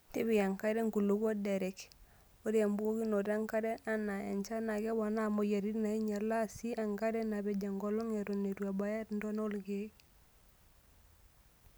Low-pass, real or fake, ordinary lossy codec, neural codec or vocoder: none; real; none; none